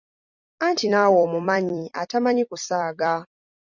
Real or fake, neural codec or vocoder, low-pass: fake; vocoder, 44.1 kHz, 128 mel bands every 256 samples, BigVGAN v2; 7.2 kHz